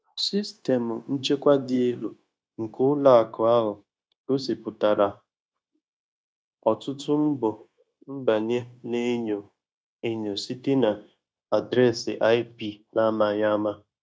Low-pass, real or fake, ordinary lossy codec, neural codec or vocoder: none; fake; none; codec, 16 kHz, 0.9 kbps, LongCat-Audio-Codec